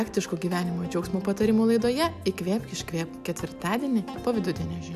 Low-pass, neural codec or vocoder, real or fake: 14.4 kHz; none; real